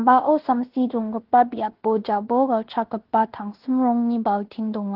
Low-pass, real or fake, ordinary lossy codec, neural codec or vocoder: 5.4 kHz; fake; Opus, 16 kbps; codec, 16 kHz in and 24 kHz out, 0.9 kbps, LongCat-Audio-Codec, fine tuned four codebook decoder